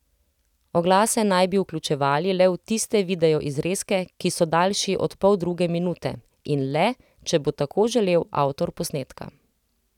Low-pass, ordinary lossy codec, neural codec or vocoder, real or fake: 19.8 kHz; none; none; real